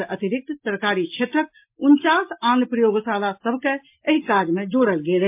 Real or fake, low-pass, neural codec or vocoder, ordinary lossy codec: real; 3.6 kHz; none; MP3, 32 kbps